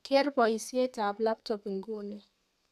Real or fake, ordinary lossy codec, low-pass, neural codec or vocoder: fake; none; 14.4 kHz; codec, 32 kHz, 1.9 kbps, SNAC